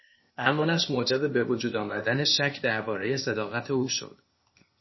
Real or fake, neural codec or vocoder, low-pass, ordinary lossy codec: fake; codec, 16 kHz, 0.8 kbps, ZipCodec; 7.2 kHz; MP3, 24 kbps